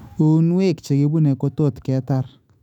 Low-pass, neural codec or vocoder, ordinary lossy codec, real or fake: 19.8 kHz; autoencoder, 48 kHz, 128 numbers a frame, DAC-VAE, trained on Japanese speech; none; fake